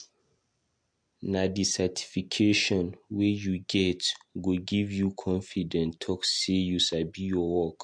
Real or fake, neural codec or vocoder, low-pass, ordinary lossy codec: real; none; 9.9 kHz; MP3, 64 kbps